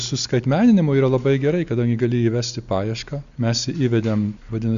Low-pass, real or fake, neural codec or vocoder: 7.2 kHz; real; none